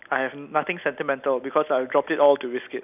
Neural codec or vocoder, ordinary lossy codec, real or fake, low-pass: none; none; real; 3.6 kHz